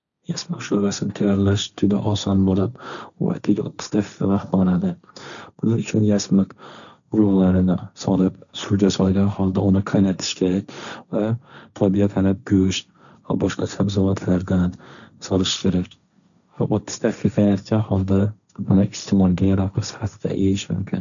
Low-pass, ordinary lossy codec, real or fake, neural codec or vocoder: 7.2 kHz; none; fake; codec, 16 kHz, 1.1 kbps, Voila-Tokenizer